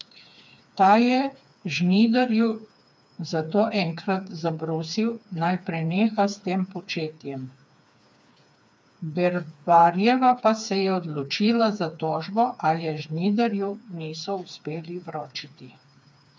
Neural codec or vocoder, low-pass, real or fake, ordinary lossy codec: codec, 16 kHz, 4 kbps, FreqCodec, smaller model; none; fake; none